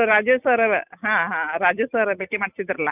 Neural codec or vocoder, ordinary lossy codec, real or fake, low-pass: none; none; real; 3.6 kHz